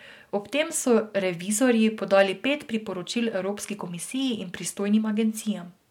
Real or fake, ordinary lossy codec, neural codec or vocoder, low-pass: real; MP3, 96 kbps; none; 19.8 kHz